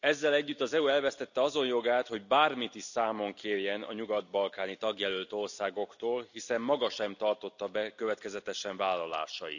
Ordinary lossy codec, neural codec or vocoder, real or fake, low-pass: none; none; real; 7.2 kHz